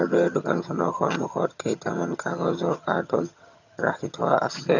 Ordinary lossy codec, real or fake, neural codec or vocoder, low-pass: none; fake; vocoder, 22.05 kHz, 80 mel bands, HiFi-GAN; 7.2 kHz